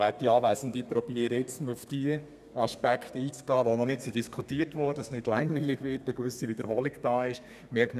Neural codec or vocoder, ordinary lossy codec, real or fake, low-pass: codec, 32 kHz, 1.9 kbps, SNAC; none; fake; 14.4 kHz